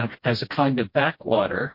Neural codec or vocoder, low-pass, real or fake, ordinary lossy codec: codec, 16 kHz, 1 kbps, FreqCodec, smaller model; 5.4 kHz; fake; MP3, 32 kbps